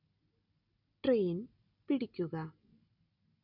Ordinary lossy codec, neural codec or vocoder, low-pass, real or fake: none; none; 5.4 kHz; real